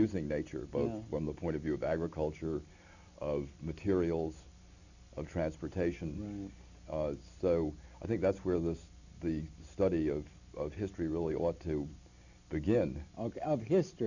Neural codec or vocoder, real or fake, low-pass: none; real; 7.2 kHz